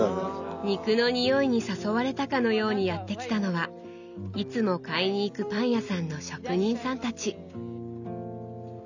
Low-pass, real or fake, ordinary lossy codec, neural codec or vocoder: 7.2 kHz; real; none; none